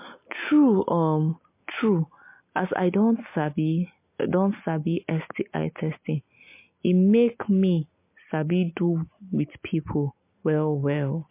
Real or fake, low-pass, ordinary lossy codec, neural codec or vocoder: real; 3.6 kHz; MP3, 24 kbps; none